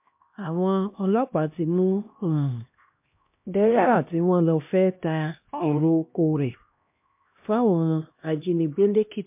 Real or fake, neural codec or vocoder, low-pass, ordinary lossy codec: fake; codec, 16 kHz, 1 kbps, X-Codec, HuBERT features, trained on LibriSpeech; 3.6 kHz; MP3, 32 kbps